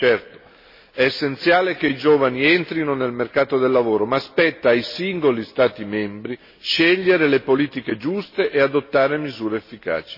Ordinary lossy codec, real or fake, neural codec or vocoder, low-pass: MP3, 24 kbps; real; none; 5.4 kHz